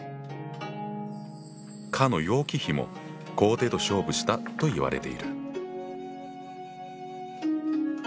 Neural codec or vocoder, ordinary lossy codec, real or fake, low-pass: none; none; real; none